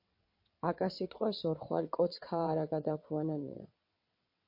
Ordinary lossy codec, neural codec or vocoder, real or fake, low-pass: MP3, 32 kbps; vocoder, 22.05 kHz, 80 mel bands, WaveNeXt; fake; 5.4 kHz